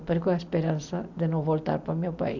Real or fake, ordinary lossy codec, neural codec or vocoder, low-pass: real; none; none; 7.2 kHz